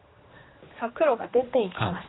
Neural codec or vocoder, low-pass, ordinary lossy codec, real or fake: codec, 16 kHz, 2 kbps, X-Codec, HuBERT features, trained on general audio; 7.2 kHz; AAC, 16 kbps; fake